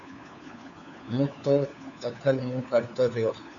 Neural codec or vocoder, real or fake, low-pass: codec, 16 kHz, 4 kbps, FunCodec, trained on LibriTTS, 50 frames a second; fake; 7.2 kHz